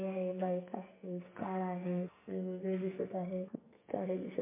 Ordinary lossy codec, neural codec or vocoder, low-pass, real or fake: MP3, 24 kbps; codec, 44.1 kHz, 2.6 kbps, SNAC; 3.6 kHz; fake